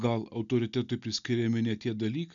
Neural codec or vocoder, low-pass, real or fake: none; 7.2 kHz; real